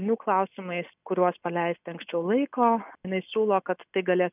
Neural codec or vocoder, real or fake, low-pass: none; real; 3.6 kHz